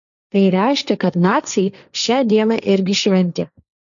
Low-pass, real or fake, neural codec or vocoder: 7.2 kHz; fake; codec, 16 kHz, 1.1 kbps, Voila-Tokenizer